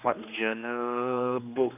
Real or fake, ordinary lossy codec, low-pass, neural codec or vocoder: fake; none; 3.6 kHz; codec, 16 kHz, 2 kbps, X-Codec, HuBERT features, trained on general audio